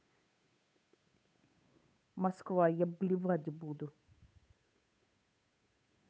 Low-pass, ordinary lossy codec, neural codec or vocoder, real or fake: none; none; codec, 16 kHz, 8 kbps, FunCodec, trained on Chinese and English, 25 frames a second; fake